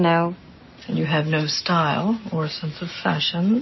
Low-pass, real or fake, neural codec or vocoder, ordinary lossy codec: 7.2 kHz; real; none; MP3, 24 kbps